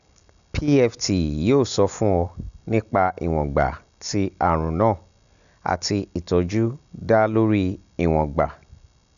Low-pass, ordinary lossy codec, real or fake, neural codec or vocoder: 7.2 kHz; none; real; none